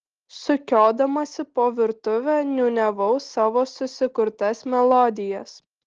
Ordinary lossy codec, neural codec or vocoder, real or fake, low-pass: Opus, 16 kbps; none; real; 7.2 kHz